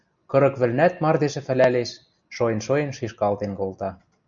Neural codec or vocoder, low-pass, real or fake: none; 7.2 kHz; real